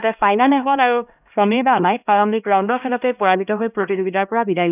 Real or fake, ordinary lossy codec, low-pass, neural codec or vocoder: fake; none; 3.6 kHz; codec, 16 kHz, 1 kbps, X-Codec, HuBERT features, trained on LibriSpeech